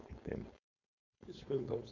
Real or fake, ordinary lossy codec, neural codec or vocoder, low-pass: fake; none; codec, 16 kHz, 4.8 kbps, FACodec; 7.2 kHz